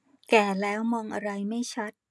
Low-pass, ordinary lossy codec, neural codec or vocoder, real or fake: none; none; none; real